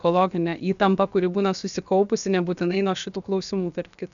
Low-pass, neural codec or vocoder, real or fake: 7.2 kHz; codec, 16 kHz, about 1 kbps, DyCAST, with the encoder's durations; fake